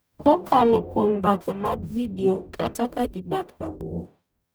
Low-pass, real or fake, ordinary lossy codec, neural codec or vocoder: none; fake; none; codec, 44.1 kHz, 0.9 kbps, DAC